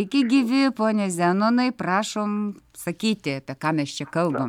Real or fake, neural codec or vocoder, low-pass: real; none; 19.8 kHz